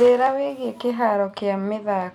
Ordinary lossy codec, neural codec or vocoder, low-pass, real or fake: none; vocoder, 44.1 kHz, 128 mel bands every 256 samples, BigVGAN v2; 19.8 kHz; fake